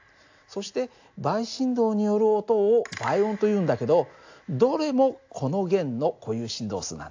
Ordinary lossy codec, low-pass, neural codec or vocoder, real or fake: AAC, 48 kbps; 7.2 kHz; none; real